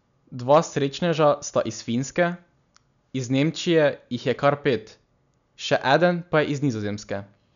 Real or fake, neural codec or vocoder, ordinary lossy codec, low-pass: real; none; none; 7.2 kHz